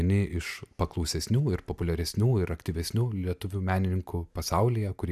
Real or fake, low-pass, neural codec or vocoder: real; 14.4 kHz; none